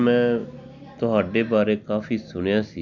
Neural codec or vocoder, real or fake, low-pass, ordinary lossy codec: none; real; 7.2 kHz; none